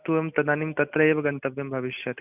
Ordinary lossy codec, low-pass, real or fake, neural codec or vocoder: none; 3.6 kHz; real; none